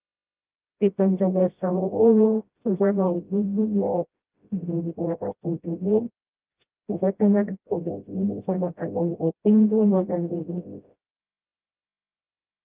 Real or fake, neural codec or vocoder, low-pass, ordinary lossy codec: fake; codec, 16 kHz, 0.5 kbps, FreqCodec, smaller model; 3.6 kHz; Opus, 32 kbps